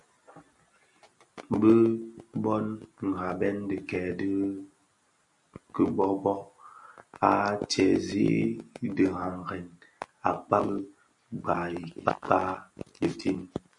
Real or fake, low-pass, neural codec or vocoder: real; 10.8 kHz; none